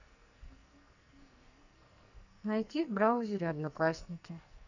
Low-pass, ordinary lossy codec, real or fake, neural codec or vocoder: 7.2 kHz; none; fake; codec, 44.1 kHz, 2.6 kbps, SNAC